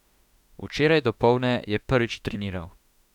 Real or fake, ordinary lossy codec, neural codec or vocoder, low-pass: fake; none; autoencoder, 48 kHz, 32 numbers a frame, DAC-VAE, trained on Japanese speech; 19.8 kHz